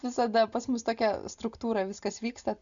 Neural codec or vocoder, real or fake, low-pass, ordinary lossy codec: none; real; 7.2 kHz; MP3, 96 kbps